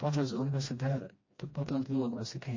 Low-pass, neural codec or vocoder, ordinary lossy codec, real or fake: 7.2 kHz; codec, 16 kHz, 1 kbps, FreqCodec, smaller model; MP3, 32 kbps; fake